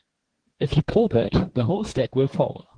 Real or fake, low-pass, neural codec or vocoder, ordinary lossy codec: fake; 9.9 kHz; codec, 24 kHz, 1 kbps, SNAC; Opus, 16 kbps